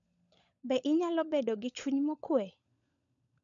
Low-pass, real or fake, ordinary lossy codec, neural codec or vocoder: 7.2 kHz; fake; MP3, 64 kbps; codec, 16 kHz, 16 kbps, FunCodec, trained on LibriTTS, 50 frames a second